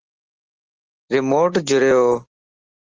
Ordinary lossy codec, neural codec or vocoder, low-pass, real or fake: Opus, 16 kbps; none; 7.2 kHz; real